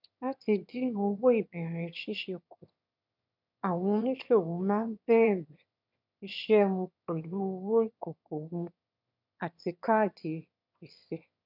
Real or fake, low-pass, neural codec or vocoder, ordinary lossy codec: fake; 5.4 kHz; autoencoder, 22.05 kHz, a latent of 192 numbers a frame, VITS, trained on one speaker; none